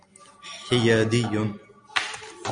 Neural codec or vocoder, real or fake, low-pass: none; real; 9.9 kHz